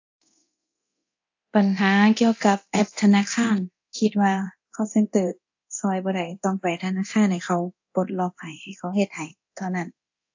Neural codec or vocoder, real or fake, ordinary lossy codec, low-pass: codec, 24 kHz, 0.9 kbps, DualCodec; fake; AAC, 48 kbps; 7.2 kHz